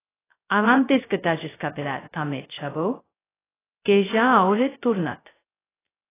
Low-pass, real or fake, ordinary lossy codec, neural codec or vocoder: 3.6 kHz; fake; AAC, 16 kbps; codec, 16 kHz, 0.2 kbps, FocalCodec